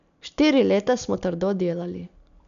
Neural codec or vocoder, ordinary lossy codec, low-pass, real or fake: none; none; 7.2 kHz; real